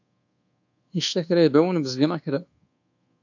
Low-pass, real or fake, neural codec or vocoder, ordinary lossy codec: 7.2 kHz; fake; codec, 24 kHz, 1.2 kbps, DualCodec; AAC, 48 kbps